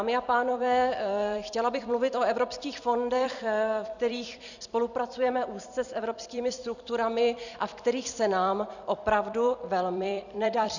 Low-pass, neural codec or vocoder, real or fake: 7.2 kHz; vocoder, 44.1 kHz, 128 mel bands every 256 samples, BigVGAN v2; fake